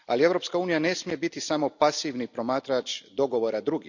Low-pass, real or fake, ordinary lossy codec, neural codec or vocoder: 7.2 kHz; real; none; none